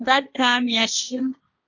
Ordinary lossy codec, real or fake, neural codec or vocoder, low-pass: AAC, 48 kbps; fake; codec, 16 kHz, 1.1 kbps, Voila-Tokenizer; 7.2 kHz